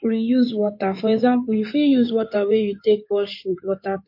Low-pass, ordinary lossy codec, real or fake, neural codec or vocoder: 5.4 kHz; MP3, 32 kbps; fake; codec, 16 kHz in and 24 kHz out, 2.2 kbps, FireRedTTS-2 codec